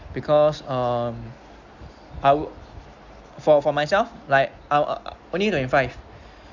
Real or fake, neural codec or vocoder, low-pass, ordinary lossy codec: real; none; 7.2 kHz; Opus, 64 kbps